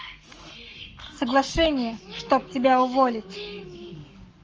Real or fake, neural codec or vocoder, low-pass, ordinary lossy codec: fake; codec, 44.1 kHz, 7.8 kbps, Pupu-Codec; 7.2 kHz; Opus, 24 kbps